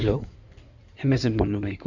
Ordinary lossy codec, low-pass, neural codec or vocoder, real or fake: none; 7.2 kHz; codec, 16 kHz in and 24 kHz out, 2.2 kbps, FireRedTTS-2 codec; fake